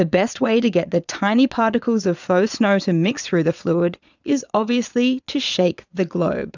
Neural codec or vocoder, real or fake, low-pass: vocoder, 22.05 kHz, 80 mel bands, Vocos; fake; 7.2 kHz